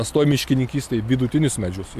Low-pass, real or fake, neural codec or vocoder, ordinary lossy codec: 14.4 kHz; real; none; AAC, 96 kbps